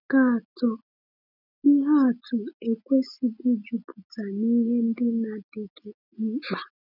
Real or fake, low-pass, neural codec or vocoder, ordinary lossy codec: real; 5.4 kHz; none; none